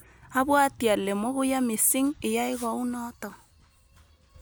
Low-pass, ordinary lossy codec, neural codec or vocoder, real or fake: none; none; none; real